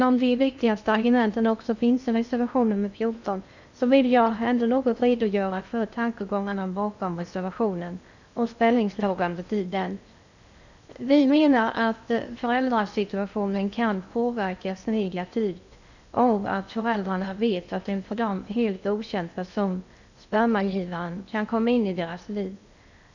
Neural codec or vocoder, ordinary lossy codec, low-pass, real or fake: codec, 16 kHz in and 24 kHz out, 0.8 kbps, FocalCodec, streaming, 65536 codes; none; 7.2 kHz; fake